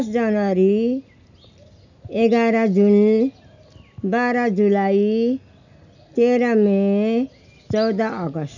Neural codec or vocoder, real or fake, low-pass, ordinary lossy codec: none; real; 7.2 kHz; none